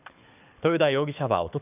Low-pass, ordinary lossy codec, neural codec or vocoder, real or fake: 3.6 kHz; none; codec, 24 kHz, 6 kbps, HILCodec; fake